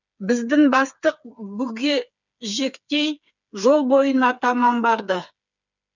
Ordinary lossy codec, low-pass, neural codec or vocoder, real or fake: none; 7.2 kHz; codec, 16 kHz, 4 kbps, FreqCodec, smaller model; fake